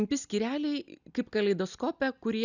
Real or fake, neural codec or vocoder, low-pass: real; none; 7.2 kHz